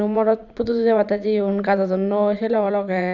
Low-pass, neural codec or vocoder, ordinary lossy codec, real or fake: 7.2 kHz; vocoder, 44.1 kHz, 80 mel bands, Vocos; none; fake